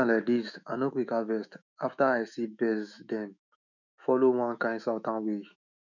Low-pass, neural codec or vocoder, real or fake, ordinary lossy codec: 7.2 kHz; autoencoder, 48 kHz, 128 numbers a frame, DAC-VAE, trained on Japanese speech; fake; none